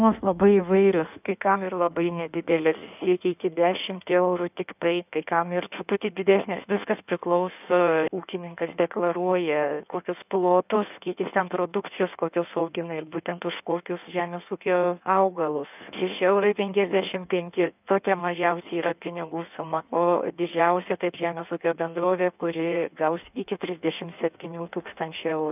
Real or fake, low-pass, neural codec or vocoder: fake; 3.6 kHz; codec, 16 kHz in and 24 kHz out, 1.1 kbps, FireRedTTS-2 codec